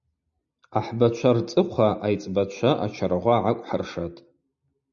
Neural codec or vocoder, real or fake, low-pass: none; real; 7.2 kHz